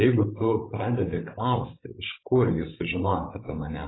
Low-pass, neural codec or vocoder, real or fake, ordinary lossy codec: 7.2 kHz; codec, 16 kHz, 16 kbps, FreqCodec, larger model; fake; AAC, 16 kbps